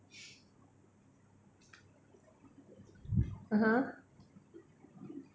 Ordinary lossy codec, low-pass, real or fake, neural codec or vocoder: none; none; real; none